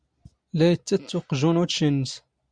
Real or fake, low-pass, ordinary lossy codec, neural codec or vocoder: real; 9.9 kHz; Opus, 64 kbps; none